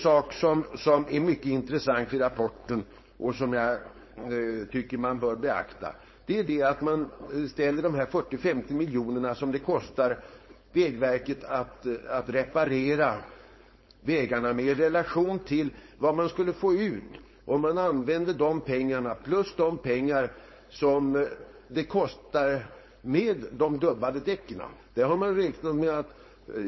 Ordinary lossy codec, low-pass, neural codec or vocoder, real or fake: MP3, 24 kbps; 7.2 kHz; codec, 16 kHz, 4.8 kbps, FACodec; fake